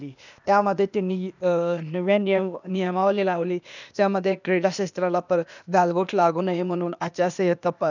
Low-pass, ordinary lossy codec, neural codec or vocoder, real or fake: 7.2 kHz; none; codec, 16 kHz, 0.8 kbps, ZipCodec; fake